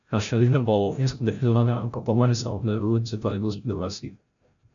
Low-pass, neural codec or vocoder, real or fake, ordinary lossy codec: 7.2 kHz; codec, 16 kHz, 0.5 kbps, FreqCodec, larger model; fake; MP3, 96 kbps